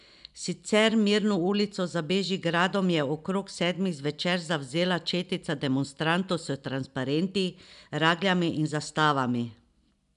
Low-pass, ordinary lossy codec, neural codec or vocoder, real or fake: 10.8 kHz; none; none; real